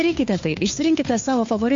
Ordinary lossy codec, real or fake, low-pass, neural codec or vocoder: MP3, 48 kbps; fake; 7.2 kHz; codec, 16 kHz, 2 kbps, FunCodec, trained on Chinese and English, 25 frames a second